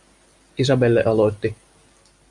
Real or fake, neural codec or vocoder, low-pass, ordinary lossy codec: real; none; 10.8 kHz; MP3, 64 kbps